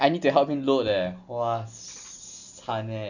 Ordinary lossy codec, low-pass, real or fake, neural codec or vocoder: none; 7.2 kHz; real; none